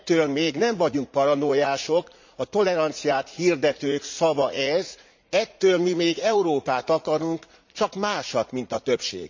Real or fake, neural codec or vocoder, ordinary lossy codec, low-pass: fake; vocoder, 44.1 kHz, 80 mel bands, Vocos; MP3, 64 kbps; 7.2 kHz